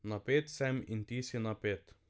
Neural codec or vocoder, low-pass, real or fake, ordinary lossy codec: none; none; real; none